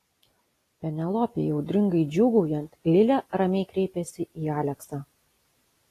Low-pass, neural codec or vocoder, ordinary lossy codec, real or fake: 14.4 kHz; none; AAC, 48 kbps; real